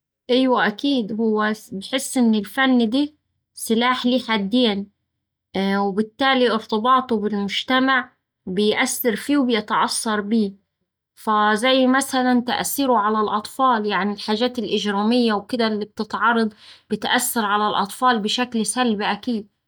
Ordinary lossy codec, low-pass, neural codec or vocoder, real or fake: none; none; none; real